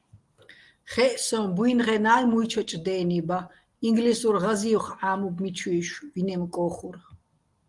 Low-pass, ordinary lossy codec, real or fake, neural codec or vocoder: 10.8 kHz; Opus, 24 kbps; real; none